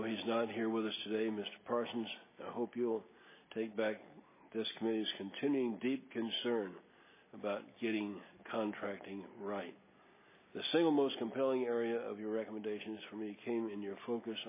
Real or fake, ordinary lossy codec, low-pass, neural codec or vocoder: real; MP3, 16 kbps; 3.6 kHz; none